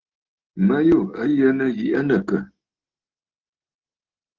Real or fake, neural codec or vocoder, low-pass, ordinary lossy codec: real; none; 7.2 kHz; Opus, 16 kbps